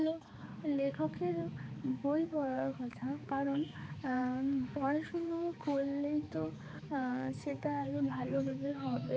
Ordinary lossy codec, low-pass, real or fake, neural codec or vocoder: none; none; fake; codec, 16 kHz, 4 kbps, X-Codec, HuBERT features, trained on balanced general audio